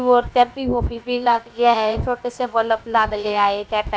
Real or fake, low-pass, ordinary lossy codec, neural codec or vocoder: fake; none; none; codec, 16 kHz, about 1 kbps, DyCAST, with the encoder's durations